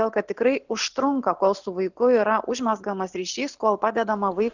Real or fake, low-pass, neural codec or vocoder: real; 7.2 kHz; none